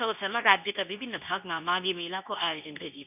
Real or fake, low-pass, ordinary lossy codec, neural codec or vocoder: fake; 3.6 kHz; none; codec, 24 kHz, 0.9 kbps, WavTokenizer, medium speech release version 2